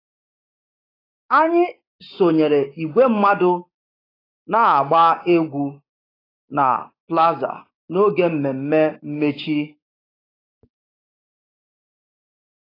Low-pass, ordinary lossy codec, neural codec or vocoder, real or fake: 5.4 kHz; AAC, 24 kbps; codec, 16 kHz, 6 kbps, DAC; fake